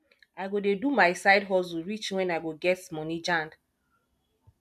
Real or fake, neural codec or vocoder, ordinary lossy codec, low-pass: real; none; MP3, 96 kbps; 14.4 kHz